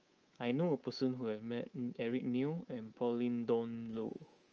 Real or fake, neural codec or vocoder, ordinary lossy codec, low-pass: fake; codec, 24 kHz, 3.1 kbps, DualCodec; Opus, 24 kbps; 7.2 kHz